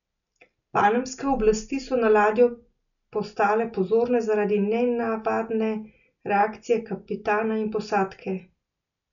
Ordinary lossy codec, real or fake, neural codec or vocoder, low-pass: none; real; none; 7.2 kHz